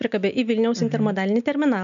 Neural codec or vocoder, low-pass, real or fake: none; 7.2 kHz; real